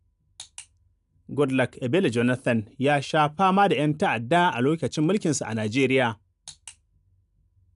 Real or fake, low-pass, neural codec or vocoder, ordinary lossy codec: real; 10.8 kHz; none; none